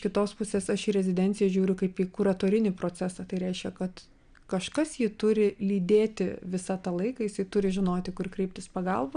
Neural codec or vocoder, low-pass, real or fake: none; 9.9 kHz; real